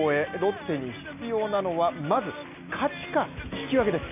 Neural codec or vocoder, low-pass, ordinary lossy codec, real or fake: none; 3.6 kHz; none; real